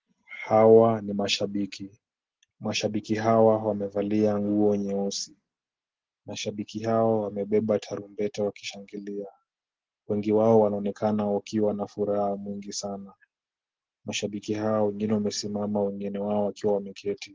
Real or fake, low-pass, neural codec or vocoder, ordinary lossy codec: real; 7.2 kHz; none; Opus, 16 kbps